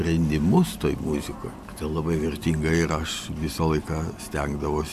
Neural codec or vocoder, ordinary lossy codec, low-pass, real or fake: none; AAC, 96 kbps; 14.4 kHz; real